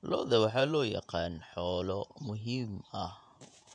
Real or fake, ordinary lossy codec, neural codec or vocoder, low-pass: fake; none; vocoder, 48 kHz, 128 mel bands, Vocos; 9.9 kHz